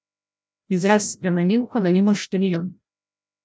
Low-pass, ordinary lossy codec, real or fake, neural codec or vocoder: none; none; fake; codec, 16 kHz, 0.5 kbps, FreqCodec, larger model